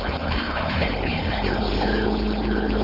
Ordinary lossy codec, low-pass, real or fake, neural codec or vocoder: Opus, 24 kbps; 5.4 kHz; fake; codec, 16 kHz, 4.8 kbps, FACodec